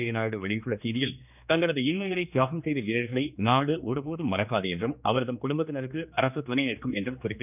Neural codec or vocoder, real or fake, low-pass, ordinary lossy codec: codec, 16 kHz, 2 kbps, X-Codec, HuBERT features, trained on general audio; fake; 3.6 kHz; none